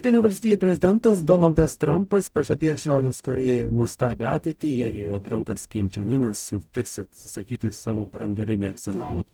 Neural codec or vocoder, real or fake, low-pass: codec, 44.1 kHz, 0.9 kbps, DAC; fake; 19.8 kHz